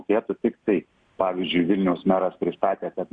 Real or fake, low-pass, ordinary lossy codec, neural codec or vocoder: real; 9.9 kHz; Opus, 64 kbps; none